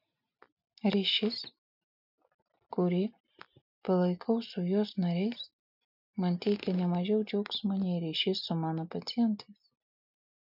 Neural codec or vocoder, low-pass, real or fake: none; 5.4 kHz; real